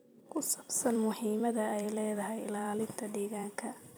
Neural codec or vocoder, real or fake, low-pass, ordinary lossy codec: vocoder, 44.1 kHz, 128 mel bands every 256 samples, BigVGAN v2; fake; none; none